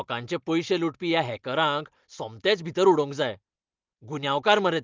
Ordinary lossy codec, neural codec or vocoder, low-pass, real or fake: Opus, 32 kbps; none; 7.2 kHz; real